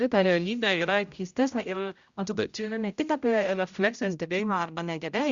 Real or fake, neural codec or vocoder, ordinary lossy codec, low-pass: fake; codec, 16 kHz, 0.5 kbps, X-Codec, HuBERT features, trained on general audio; Opus, 64 kbps; 7.2 kHz